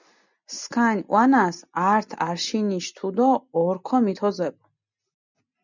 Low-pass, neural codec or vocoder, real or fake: 7.2 kHz; none; real